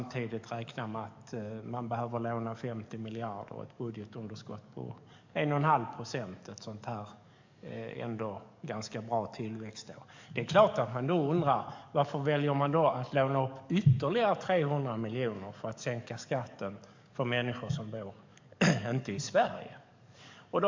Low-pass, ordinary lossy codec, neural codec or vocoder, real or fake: 7.2 kHz; MP3, 64 kbps; codec, 44.1 kHz, 7.8 kbps, DAC; fake